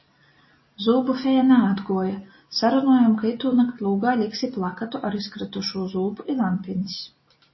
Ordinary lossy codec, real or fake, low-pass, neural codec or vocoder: MP3, 24 kbps; real; 7.2 kHz; none